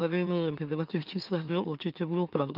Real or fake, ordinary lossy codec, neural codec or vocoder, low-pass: fake; Opus, 24 kbps; autoencoder, 44.1 kHz, a latent of 192 numbers a frame, MeloTTS; 5.4 kHz